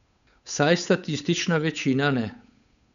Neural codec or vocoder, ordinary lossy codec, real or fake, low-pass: codec, 16 kHz, 8 kbps, FunCodec, trained on Chinese and English, 25 frames a second; none; fake; 7.2 kHz